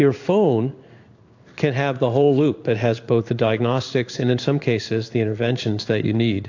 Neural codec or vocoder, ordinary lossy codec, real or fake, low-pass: codec, 16 kHz in and 24 kHz out, 1 kbps, XY-Tokenizer; AAC, 48 kbps; fake; 7.2 kHz